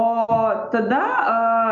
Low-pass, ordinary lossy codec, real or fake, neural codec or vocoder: 7.2 kHz; MP3, 64 kbps; real; none